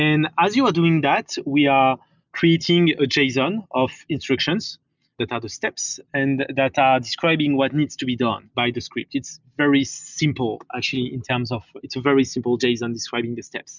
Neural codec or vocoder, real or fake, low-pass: none; real; 7.2 kHz